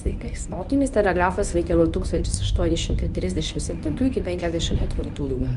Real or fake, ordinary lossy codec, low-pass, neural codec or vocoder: fake; Opus, 64 kbps; 10.8 kHz; codec, 24 kHz, 0.9 kbps, WavTokenizer, medium speech release version 1